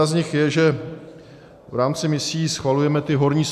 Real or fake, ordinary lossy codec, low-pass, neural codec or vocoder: real; AAC, 96 kbps; 14.4 kHz; none